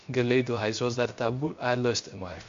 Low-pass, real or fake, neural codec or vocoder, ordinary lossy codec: 7.2 kHz; fake; codec, 16 kHz, 0.3 kbps, FocalCodec; MP3, 48 kbps